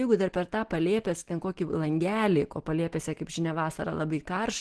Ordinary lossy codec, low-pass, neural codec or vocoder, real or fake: Opus, 16 kbps; 10.8 kHz; none; real